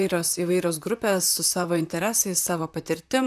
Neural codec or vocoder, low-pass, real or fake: vocoder, 44.1 kHz, 128 mel bands, Pupu-Vocoder; 14.4 kHz; fake